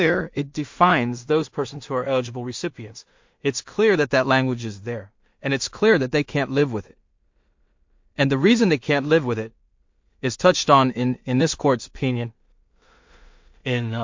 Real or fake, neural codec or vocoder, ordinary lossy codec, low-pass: fake; codec, 16 kHz in and 24 kHz out, 0.4 kbps, LongCat-Audio-Codec, two codebook decoder; MP3, 48 kbps; 7.2 kHz